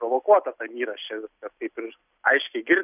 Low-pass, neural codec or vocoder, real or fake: 3.6 kHz; none; real